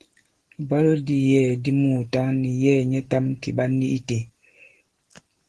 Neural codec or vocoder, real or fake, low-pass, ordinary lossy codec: none; real; 10.8 kHz; Opus, 16 kbps